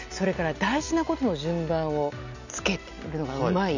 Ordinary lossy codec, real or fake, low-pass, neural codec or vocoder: none; real; 7.2 kHz; none